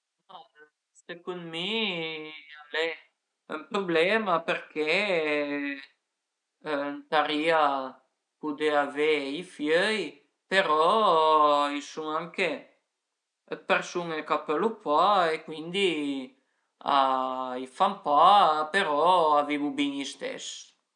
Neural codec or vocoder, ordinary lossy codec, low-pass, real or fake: none; none; 9.9 kHz; real